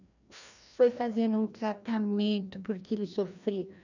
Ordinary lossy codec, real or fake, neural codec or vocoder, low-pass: none; fake; codec, 16 kHz, 1 kbps, FreqCodec, larger model; 7.2 kHz